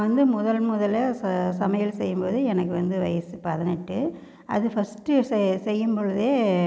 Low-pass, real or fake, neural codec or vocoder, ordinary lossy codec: none; real; none; none